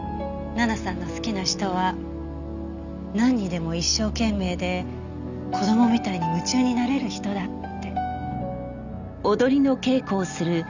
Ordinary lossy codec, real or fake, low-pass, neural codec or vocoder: none; real; 7.2 kHz; none